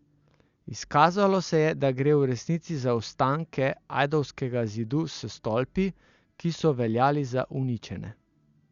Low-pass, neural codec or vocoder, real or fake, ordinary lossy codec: 7.2 kHz; none; real; Opus, 64 kbps